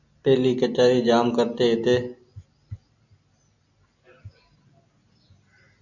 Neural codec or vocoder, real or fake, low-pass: none; real; 7.2 kHz